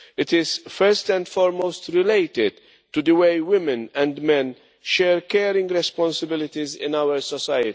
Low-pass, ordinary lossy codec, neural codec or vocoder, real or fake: none; none; none; real